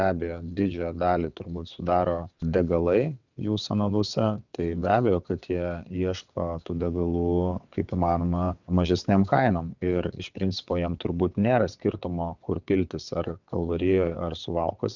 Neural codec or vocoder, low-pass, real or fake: vocoder, 22.05 kHz, 80 mel bands, WaveNeXt; 7.2 kHz; fake